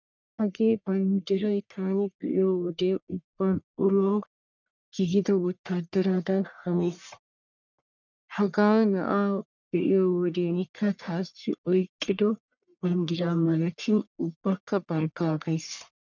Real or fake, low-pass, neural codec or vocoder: fake; 7.2 kHz; codec, 44.1 kHz, 1.7 kbps, Pupu-Codec